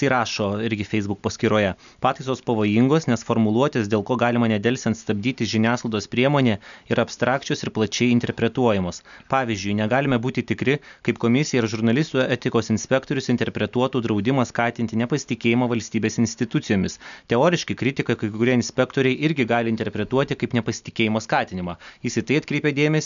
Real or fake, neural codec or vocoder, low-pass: real; none; 7.2 kHz